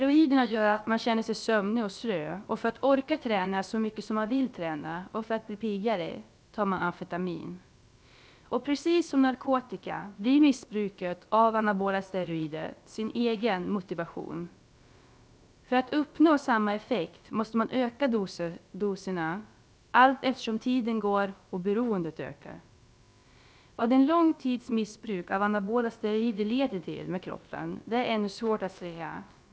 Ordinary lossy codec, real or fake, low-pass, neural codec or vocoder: none; fake; none; codec, 16 kHz, about 1 kbps, DyCAST, with the encoder's durations